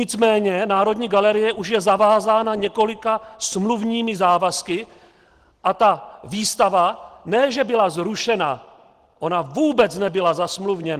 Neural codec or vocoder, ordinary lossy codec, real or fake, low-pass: none; Opus, 16 kbps; real; 14.4 kHz